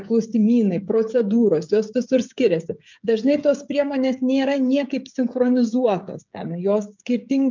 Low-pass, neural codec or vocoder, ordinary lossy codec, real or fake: 7.2 kHz; codec, 16 kHz, 16 kbps, FreqCodec, smaller model; MP3, 64 kbps; fake